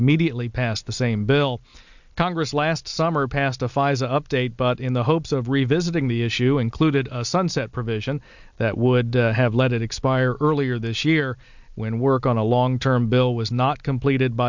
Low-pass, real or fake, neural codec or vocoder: 7.2 kHz; real; none